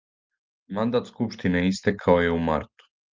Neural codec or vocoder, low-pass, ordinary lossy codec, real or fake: none; 7.2 kHz; Opus, 32 kbps; real